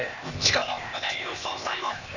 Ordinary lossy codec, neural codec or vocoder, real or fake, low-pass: none; codec, 16 kHz, 0.8 kbps, ZipCodec; fake; 7.2 kHz